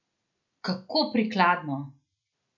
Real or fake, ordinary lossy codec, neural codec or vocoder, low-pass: real; none; none; 7.2 kHz